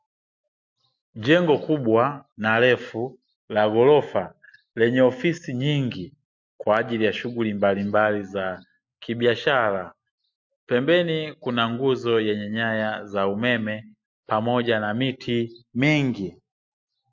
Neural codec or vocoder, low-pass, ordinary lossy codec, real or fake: none; 7.2 kHz; MP3, 48 kbps; real